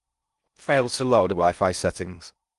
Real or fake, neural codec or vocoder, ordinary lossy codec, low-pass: fake; codec, 16 kHz in and 24 kHz out, 0.6 kbps, FocalCodec, streaming, 4096 codes; Opus, 32 kbps; 10.8 kHz